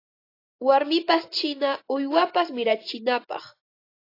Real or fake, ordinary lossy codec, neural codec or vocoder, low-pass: real; AAC, 32 kbps; none; 5.4 kHz